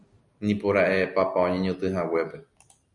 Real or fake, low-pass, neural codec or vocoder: real; 10.8 kHz; none